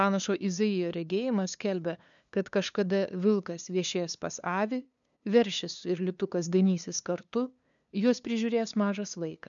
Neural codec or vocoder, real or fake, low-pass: codec, 16 kHz, 2 kbps, FunCodec, trained on LibriTTS, 25 frames a second; fake; 7.2 kHz